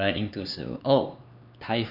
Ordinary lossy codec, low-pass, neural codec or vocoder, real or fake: none; 5.4 kHz; codec, 16 kHz, 4 kbps, X-Codec, HuBERT features, trained on LibriSpeech; fake